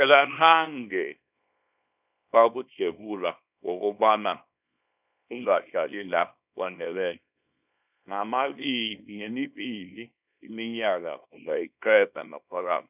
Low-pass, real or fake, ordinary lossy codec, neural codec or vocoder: 3.6 kHz; fake; none; codec, 24 kHz, 0.9 kbps, WavTokenizer, small release